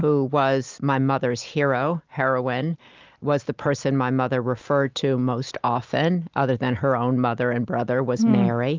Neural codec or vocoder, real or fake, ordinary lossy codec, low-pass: none; real; Opus, 32 kbps; 7.2 kHz